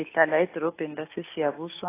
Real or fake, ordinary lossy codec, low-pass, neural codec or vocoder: real; AAC, 24 kbps; 3.6 kHz; none